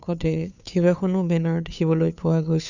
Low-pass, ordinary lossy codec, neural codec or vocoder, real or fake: 7.2 kHz; none; codec, 16 kHz, 2 kbps, FunCodec, trained on Chinese and English, 25 frames a second; fake